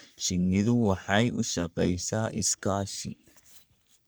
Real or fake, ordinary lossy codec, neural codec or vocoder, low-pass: fake; none; codec, 44.1 kHz, 3.4 kbps, Pupu-Codec; none